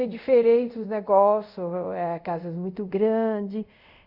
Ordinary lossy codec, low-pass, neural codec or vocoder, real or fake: none; 5.4 kHz; codec, 24 kHz, 0.5 kbps, DualCodec; fake